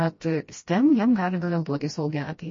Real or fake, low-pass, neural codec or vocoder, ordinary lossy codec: fake; 7.2 kHz; codec, 16 kHz, 1 kbps, FreqCodec, smaller model; MP3, 32 kbps